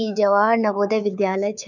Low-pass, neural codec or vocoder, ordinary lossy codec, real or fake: 7.2 kHz; codec, 24 kHz, 3.1 kbps, DualCodec; none; fake